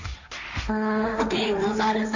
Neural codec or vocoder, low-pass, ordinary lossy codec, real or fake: codec, 16 kHz, 1.1 kbps, Voila-Tokenizer; 7.2 kHz; none; fake